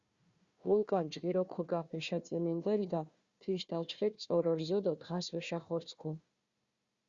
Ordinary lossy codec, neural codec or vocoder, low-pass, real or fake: Opus, 64 kbps; codec, 16 kHz, 1 kbps, FunCodec, trained on Chinese and English, 50 frames a second; 7.2 kHz; fake